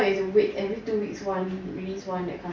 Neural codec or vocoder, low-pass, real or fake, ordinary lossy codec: none; 7.2 kHz; real; MP3, 48 kbps